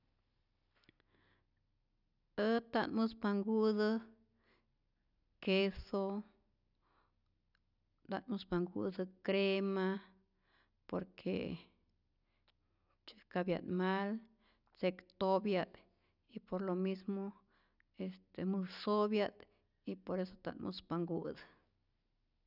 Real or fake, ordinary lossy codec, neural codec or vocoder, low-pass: real; none; none; 5.4 kHz